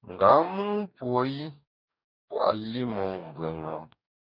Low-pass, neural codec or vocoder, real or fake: 5.4 kHz; codec, 44.1 kHz, 2.6 kbps, DAC; fake